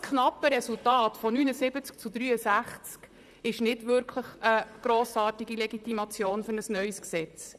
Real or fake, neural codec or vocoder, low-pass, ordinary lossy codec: fake; vocoder, 44.1 kHz, 128 mel bands, Pupu-Vocoder; 14.4 kHz; none